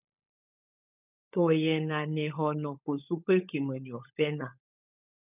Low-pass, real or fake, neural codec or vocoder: 3.6 kHz; fake; codec, 16 kHz, 16 kbps, FunCodec, trained on LibriTTS, 50 frames a second